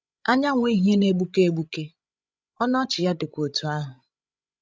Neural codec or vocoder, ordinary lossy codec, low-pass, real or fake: codec, 16 kHz, 16 kbps, FreqCodec, larger model; none; none; fake